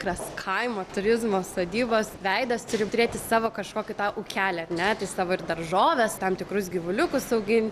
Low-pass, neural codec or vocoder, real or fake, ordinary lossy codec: 14.4 kHz; none; real; Opus, 64 kbps